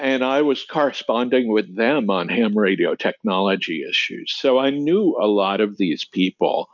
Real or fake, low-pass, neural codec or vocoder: real; 7.2 kHz; none